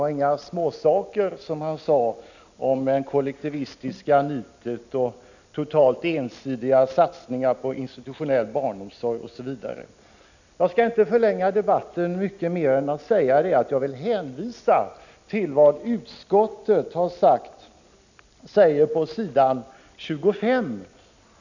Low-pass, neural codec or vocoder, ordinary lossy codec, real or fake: 7.2 kHz; none; none; real